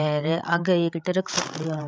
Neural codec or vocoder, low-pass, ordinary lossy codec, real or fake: codec, 16 kHz, 16 kbps, FreqCodec, larger model; none; none; fake